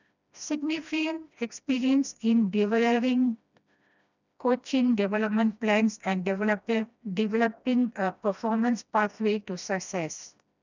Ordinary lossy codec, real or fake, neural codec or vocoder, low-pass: none; fake; codec, 16 kHz, 1 kbps, FreqCodec, smaller model; 7.2 kHz